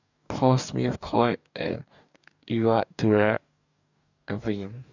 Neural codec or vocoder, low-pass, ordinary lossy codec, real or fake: codec, 44.1 kHz, 2.6 kbps, DAC; 7.2 kHz; none; fake